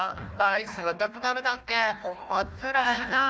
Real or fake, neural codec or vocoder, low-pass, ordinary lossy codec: fake; codec, 16 kHz, 1 kbps, FunCodec, trained on Chinese and English, 50 frames a second; none; none